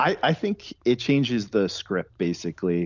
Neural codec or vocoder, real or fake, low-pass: none; real; 7.2 kHz